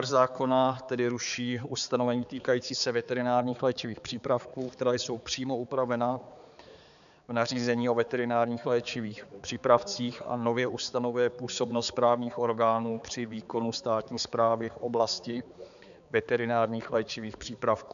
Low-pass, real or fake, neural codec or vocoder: 7.2 kHz; fake; codec, 16 kHz, 4 kbps, X-Codec, HuBERT features, trained on balanced general audio